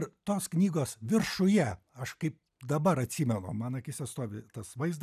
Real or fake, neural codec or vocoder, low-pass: real; none; 14.4 kHz